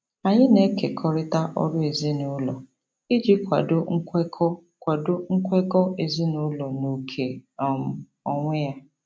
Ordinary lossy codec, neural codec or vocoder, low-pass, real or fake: none; none; none; real